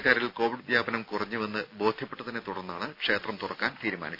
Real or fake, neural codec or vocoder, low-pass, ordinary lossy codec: real; none; 5.4 kHz; none